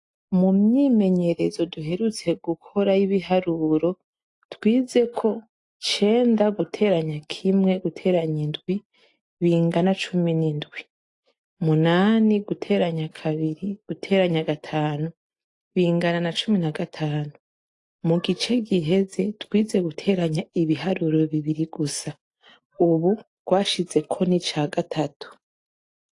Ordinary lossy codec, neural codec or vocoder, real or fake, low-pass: AAC, 48 kbps; none; real; 10.8 kHz